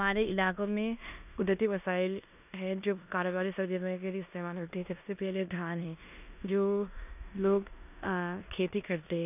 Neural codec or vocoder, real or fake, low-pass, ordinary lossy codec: codec, 16 kHz in and 24 kHz out, 0.9 kbps, LongCat-Audio-Codec, four codebook decoder; fake; 3.6 kHz; none